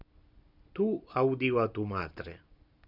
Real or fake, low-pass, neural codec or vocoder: real; 5.4 kHz; none